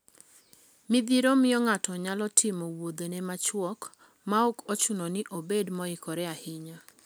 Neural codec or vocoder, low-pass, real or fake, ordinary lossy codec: none; none; real; none